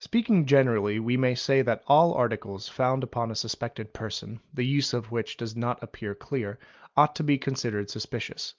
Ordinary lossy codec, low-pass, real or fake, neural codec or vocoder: Opus, 24 kbps; 7.2 kHz; real; none